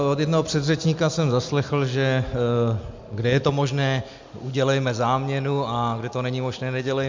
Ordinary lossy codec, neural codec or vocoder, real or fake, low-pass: MP3, 64 kbps; none; real; 7.2 kHz